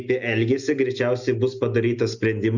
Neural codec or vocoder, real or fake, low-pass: none; real; 7.2 kHz